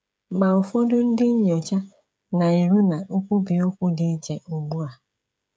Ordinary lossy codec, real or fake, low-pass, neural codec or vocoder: none; fake; none; codec, 16 kHz, 16 kbps, FreqCodec, smaller model